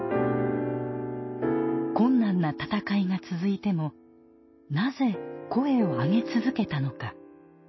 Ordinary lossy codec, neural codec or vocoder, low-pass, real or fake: MP3, 24 kbps; none; 7.2 kHz; real